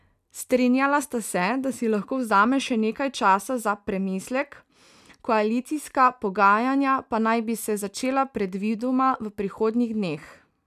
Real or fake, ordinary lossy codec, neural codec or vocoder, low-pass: real; none; none; 14.4 kHz